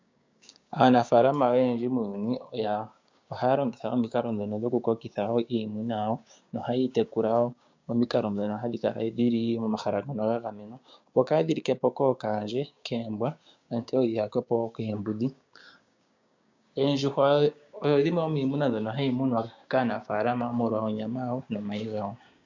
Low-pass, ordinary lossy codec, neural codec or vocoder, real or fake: 7.2 kHz; MP3, 64 kbps; codec, 16 kHz, 6 kbps, DAC; fake